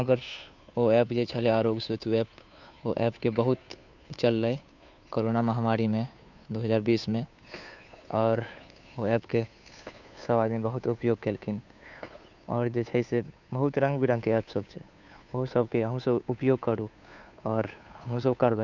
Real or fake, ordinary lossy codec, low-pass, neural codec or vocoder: fake; none; 7.2 kHz; codec, 16 kHz, 2 kbps, FunCodec, trained on Chinese and English, 25 frames a second